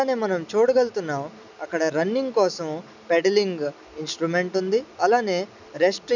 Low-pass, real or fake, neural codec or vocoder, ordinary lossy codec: 7.2 kHz; real; none; none